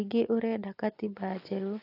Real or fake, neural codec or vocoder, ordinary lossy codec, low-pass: real; none; AAC, 24 kbps; 5.4 kHz